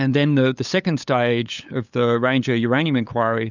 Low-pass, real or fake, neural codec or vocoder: 7.2 kHz; fake; codec, 16 kHz, 8 kbps, FunCodec, trained on LibriTTS, 25 frames a second